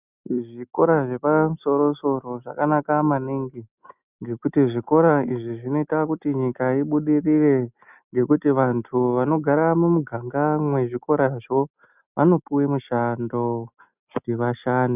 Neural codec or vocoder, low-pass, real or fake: none; 3.6 kHz; real